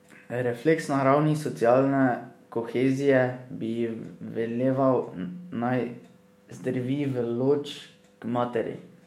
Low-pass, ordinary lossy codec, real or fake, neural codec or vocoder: 19.8 kHz; MP3, 64 kbps; fake; autoencoder, 48 kHz, 128 numbers a frame, DAC-VAE, trained on Japanese speech